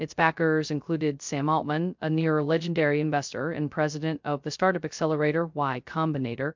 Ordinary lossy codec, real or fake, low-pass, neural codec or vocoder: MP3, 64 kbps; fake; 7.2 kHz; codec, 16 kHz, 0.2 kbps, FocalCodec